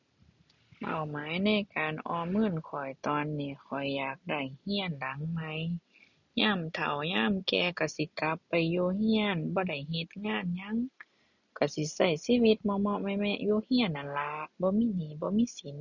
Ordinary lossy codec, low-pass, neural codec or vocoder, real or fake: none; 7.2 kHz; none; real